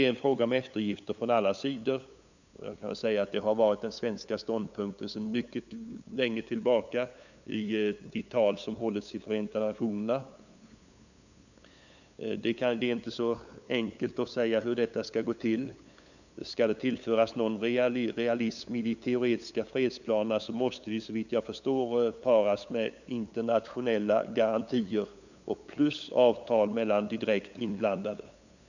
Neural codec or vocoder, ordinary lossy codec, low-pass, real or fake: codec, 16 kHz, 8 kbps, FunCodec, trained on LibriTTS, 25 frames a second; none; 7.2 kHz; fake